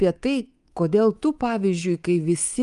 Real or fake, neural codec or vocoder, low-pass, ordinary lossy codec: fake; codec, 24 kHz, 3.1 kbps, DualCodec; 10.8 kHz; Opus, 32 kbps